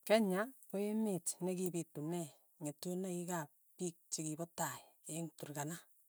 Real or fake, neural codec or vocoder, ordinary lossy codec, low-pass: real; none; none; none